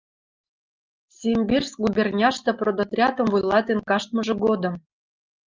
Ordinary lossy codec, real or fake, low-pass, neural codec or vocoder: Opus, 24 kbps; real; 7.2 kHz; none